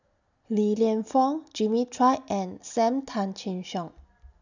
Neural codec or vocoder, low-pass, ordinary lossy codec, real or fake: none; 7.2 kHz; none; real